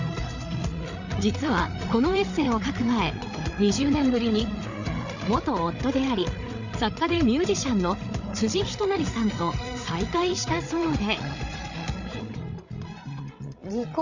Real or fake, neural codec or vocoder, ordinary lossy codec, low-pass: fake; codec, 16 kHz, 8 kbps, FreqCodec, larger model; Opus, 64 kbps; 7.2 kHz